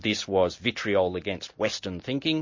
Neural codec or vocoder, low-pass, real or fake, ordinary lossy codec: none; 7.2 kHz; real; MP3, 32 kbps